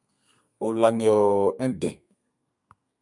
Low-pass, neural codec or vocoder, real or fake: 10.8 kHz; codec, 32 kHz, 1.9 kbps, SNAC; fake